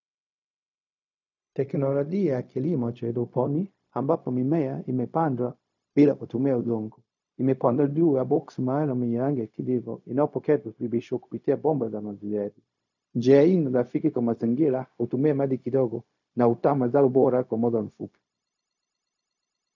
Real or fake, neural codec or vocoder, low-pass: fake; codec, 16 kHz, 0.4 kbps, LongCat-Audio-Codec; 7.2 kHz